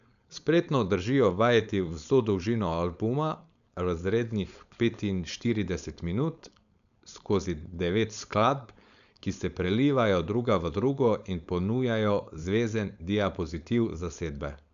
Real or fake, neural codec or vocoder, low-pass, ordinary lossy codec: fake; codec, 16 kHz, 4.8 kbps, FACodec; 7.2 kHz; none